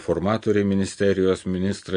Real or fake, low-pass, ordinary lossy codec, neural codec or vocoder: real; 9.9 kHz; MP3, 48 kbps; none